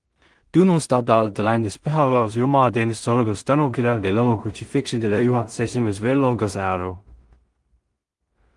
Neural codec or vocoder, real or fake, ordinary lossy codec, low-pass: codec, 16 kHz in and 24 kHz out, 0.4 kbps, LongCat-Audio-Codec, two codebook decoder; fake; Opus, 24 kbps; 10.8 kHz